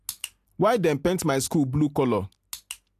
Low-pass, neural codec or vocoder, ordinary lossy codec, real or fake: 14.4 kHz; none; AAC, 64 kbps; real